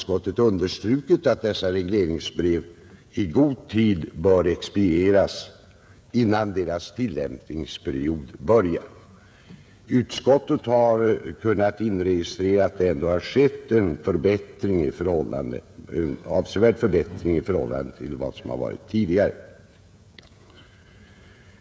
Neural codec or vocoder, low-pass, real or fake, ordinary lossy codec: codec, 16 kHz, 16 kbps, FreqCodec, smaller model; none; fake; none